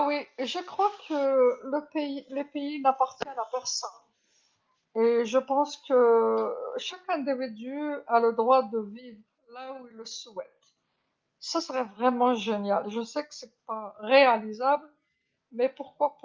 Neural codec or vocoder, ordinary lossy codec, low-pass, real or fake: none; Opus, 32 kbps; 7.2 kHz; real